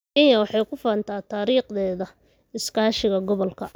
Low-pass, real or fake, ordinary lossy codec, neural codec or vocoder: none; real; none; none